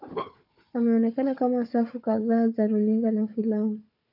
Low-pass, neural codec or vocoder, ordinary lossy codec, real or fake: 5.4 kHz; codec, 16 kHz, 4 kbps, FunCodec, trained on Chinese and English, 50 frames a second; AAC, 48 kbps; fake